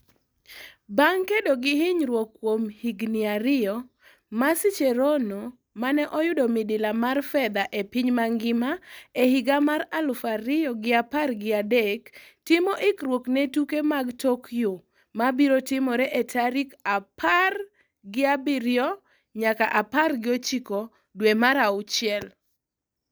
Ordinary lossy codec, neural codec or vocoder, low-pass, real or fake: none; none; none; real